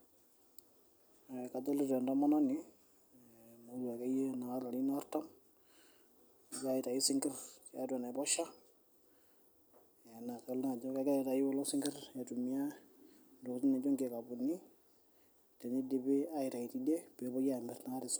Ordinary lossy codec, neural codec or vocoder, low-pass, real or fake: none; none; none; real